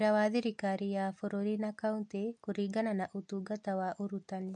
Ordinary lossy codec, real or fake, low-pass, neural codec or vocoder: MP3, 48 kbps; real; 9.9 kHz; none